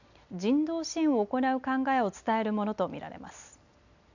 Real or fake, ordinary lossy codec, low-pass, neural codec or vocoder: real; none; 7.2 kHz; none